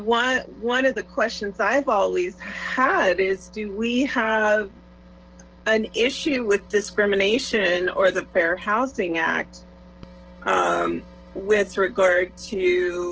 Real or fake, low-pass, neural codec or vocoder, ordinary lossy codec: fake; 7.2 kHz; codec, 16 kHz, 16 kbps, FreqCodec, smaller model; Opus, 16 kbps